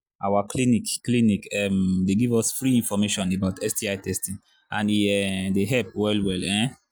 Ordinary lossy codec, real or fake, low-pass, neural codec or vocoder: none; real; none; none